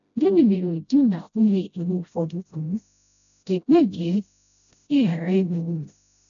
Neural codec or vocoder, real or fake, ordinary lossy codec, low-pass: codec, 16 kHz, 0.5 kbps, FreqCodec, smaller model; fake; none; 7.2 kHz